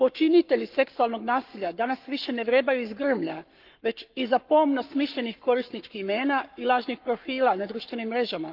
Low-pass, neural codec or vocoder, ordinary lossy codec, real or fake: 5.4 kHz; codec, 44.1 kHz, 7.8 kbps, Pupu-Codec; Opus, 24 kbps; fake